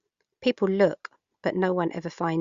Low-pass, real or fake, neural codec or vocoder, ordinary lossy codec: 7.2 kHz; real; none; Opus, 64 kbps